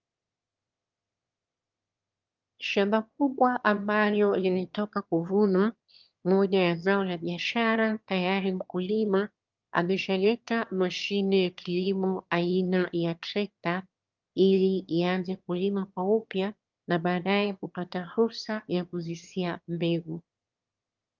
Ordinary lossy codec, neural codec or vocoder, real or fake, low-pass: Opus, 24 kbps; autoencoder, 22.05 kHz, a latent of 192 numbers a frame, VITS, trained on one speaker; fake; 7.2 kHz